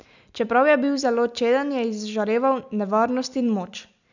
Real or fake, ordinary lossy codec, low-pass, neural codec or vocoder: real; none; 7.2 kHz; none